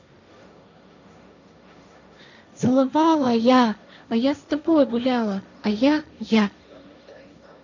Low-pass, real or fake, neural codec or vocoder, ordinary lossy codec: 7.2 kHz; fake; codec, 16 kHz, 1.1 kbps, Voila-Tokenizer; none